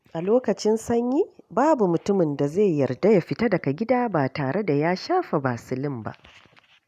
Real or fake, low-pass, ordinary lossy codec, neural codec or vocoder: real; 14.4 kHz; none; none